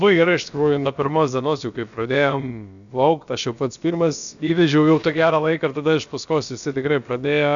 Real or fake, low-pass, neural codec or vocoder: fake; 7.2 kHz; codec, 16 kHz, about 1 kbps, DyCAST, with the encoder's durations